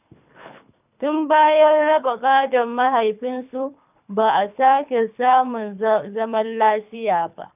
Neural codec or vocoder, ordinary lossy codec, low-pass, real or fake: codec, 24 kHz, 3 kbps, HILCodec; none; 3.6 kHz; fake